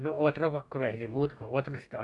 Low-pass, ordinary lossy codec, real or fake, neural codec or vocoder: 10.8 kHz; none; fake; codec, 44.1 kHz, 2.6 kbps, DAC